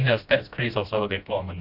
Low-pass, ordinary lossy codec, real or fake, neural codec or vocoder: 5.4 kHz; none; fake; codec, 16 kHz, 1 kbps, FreqCodec, smaller model